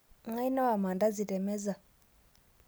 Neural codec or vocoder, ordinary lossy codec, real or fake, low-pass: none; none; real; none